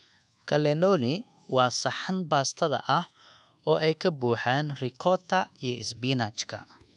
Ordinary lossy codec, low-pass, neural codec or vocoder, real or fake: none; 10.8 kHz; codec, 24 kHz, 1.2 kbps, DualCodec; fake